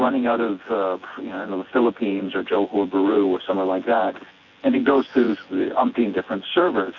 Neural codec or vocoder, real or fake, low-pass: vocoder, 24 kHz, 100 mel bands, Vocos; fake; 7.2 kHz